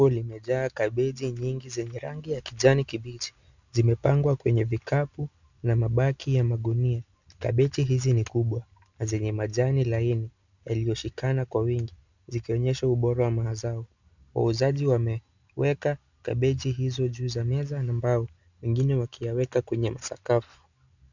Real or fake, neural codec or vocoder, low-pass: real; none; 7.2 kHz